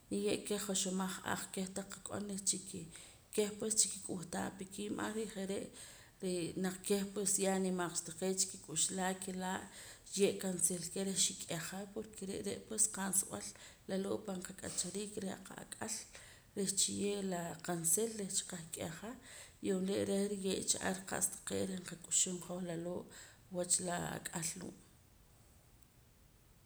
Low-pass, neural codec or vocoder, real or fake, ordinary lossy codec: none; none; real; none